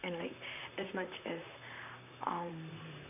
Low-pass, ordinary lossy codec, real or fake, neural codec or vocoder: 3.6 kHz; none; fake; vocoder, 44.1 kHz, 128 mel bands, Pupu-Vocoder